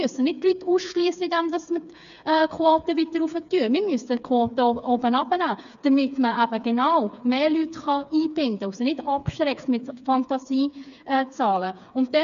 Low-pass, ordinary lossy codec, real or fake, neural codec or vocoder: 7.2 kHz; none; fake; codec, 16 kHz, 4 kbps, FreqCodec, smaller model